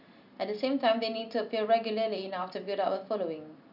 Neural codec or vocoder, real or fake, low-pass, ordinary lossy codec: none; real; 5.4 kHz; none